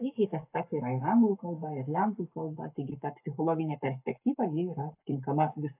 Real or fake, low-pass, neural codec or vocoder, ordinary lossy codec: fake; 3.6 kHz; codec, 44.1 kHz, 7.8 kbps, Pupu-Codec; AAC, 32 kbps